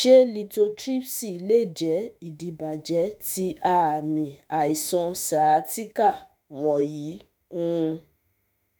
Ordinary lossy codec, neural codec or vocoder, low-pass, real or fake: none; autoencoder, 48 kHz, 32 numbers a frame, DAC-VAE, trained on Japanese speech; none; fake